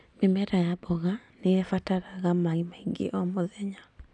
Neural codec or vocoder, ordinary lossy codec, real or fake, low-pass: none; none; real; 10.8 kHz